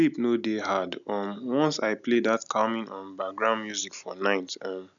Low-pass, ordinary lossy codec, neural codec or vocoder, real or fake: 7.2 kHz; none; none; real